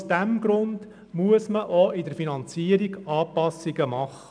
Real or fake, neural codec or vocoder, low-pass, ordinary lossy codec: real; none; 9.9 kHz; none